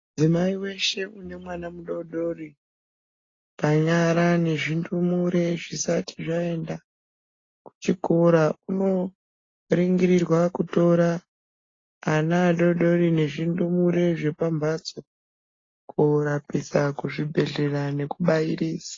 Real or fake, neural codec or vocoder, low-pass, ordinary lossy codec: real; none; 7.2 kHz; AAC, 32 kbps